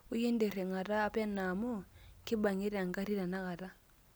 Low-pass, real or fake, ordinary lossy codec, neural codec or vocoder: none; real; none; none